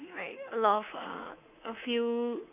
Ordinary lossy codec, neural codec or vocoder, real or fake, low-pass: none; codec, 24 kHz, 3.1 kbps, DualCodec; fake; 3.6 kHz